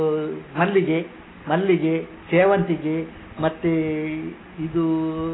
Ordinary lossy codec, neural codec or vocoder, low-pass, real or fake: AAC, 16 kbps; none; 7.2 kHz; real